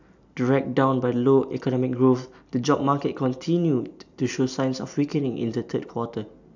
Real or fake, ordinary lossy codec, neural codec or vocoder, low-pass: real; none; none; 7.2 kHz